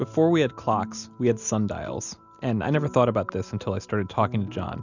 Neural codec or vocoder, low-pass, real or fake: none; 7.2 kHz; real